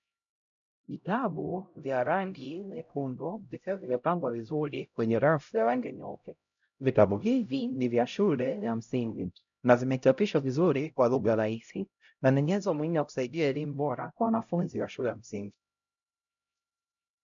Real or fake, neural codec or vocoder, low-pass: fake; codec, 16 kHz, 0.5 kbps, X-Codec, HuBERT features, trained on LibriSpeech; 7.2 kHz